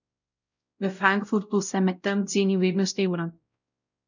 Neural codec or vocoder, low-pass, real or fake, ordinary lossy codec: codec, 16 kHz, 0.5 kbps, X-Codec, WavLM features, trained on Multilingual LibriSpeech; 7.2 kHz; fake; none